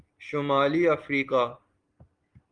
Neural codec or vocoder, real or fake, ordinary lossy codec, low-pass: none; real; Opus, 24 kbps; 9.9 kHz